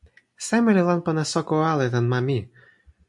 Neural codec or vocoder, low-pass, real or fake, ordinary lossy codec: none; 10.8 kHz; real; MP3, 64 kbps